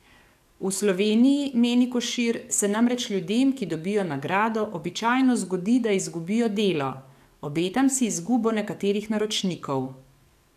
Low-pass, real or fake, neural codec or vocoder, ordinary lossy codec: 14.4 kHz; fake; codec, 44.1 kHz, 7.8 kbps, DAC; AAC, 96 kbps